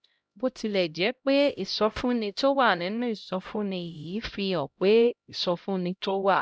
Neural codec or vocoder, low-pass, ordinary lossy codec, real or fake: codec, 16 kHz, 0.5 kbps, X-Codec, HuBERT features, trained on LibriSpeech; none; none; fake